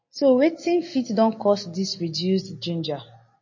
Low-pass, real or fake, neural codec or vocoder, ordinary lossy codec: 7.2 kHz; real; none; MP3, 32 kbps